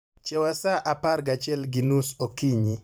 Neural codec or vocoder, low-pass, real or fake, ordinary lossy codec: vocoder, 44.1 kHz, 128 mel bands every 512 samples, BigVGAN v2; none; fake; none